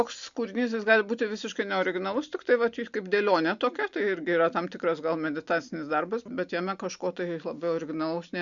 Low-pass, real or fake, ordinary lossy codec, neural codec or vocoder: 7.2 kHz; real; Opus, 64 kbps; none